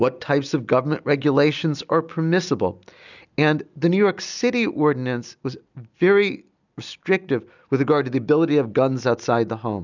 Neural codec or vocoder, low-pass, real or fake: none; 7.2 kHz; real